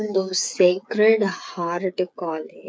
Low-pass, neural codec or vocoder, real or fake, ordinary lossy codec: none; codec, 16 kHz, 8 kbps, FreqCodec, smaller model; fake; none